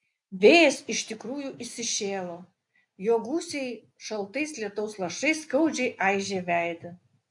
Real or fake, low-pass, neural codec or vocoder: real; 10.8 kHz; none